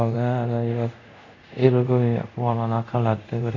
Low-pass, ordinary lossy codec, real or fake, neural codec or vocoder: 7.2 kHz; AAC, 48 kbps; fake; codec, 24 kHz, 0.5 kbps, DualCodec